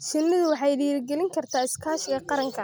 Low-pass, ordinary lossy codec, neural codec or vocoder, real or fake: none; none; none; real